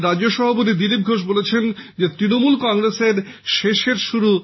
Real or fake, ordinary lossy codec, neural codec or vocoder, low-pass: real; MP3, 24 kbps; none; 7.2 kHz